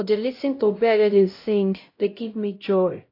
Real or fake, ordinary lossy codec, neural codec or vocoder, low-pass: fake; AAC, 32 kbps; codec, 16 kHz, 0.5 kbps, X-Codec, HuBERT features, trained on LibriSpeech; 5.4 kHz